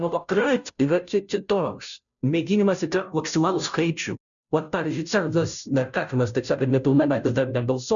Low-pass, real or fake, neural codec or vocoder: 7.2 kHz; fake; codec, 16 kHz, 0.5 kbps, FunCodec, trained on Chinese and English, 25 frames a second